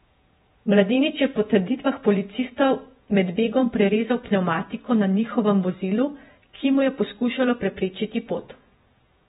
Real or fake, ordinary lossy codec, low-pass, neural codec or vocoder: fake; AAC, 16 kbps; 19.8 kHz; vocoder, 48 kHz, 128 mel bands, Vocos